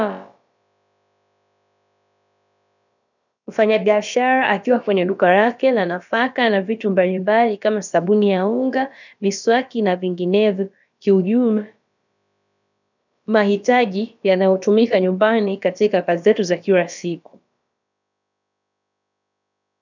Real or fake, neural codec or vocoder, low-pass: fake; codec, 16 kHz, about 1 kbps, DyCAST, with the encoder's durations; 7.2 kHz